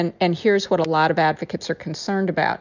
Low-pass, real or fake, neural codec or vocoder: 7.2 kHz; real; none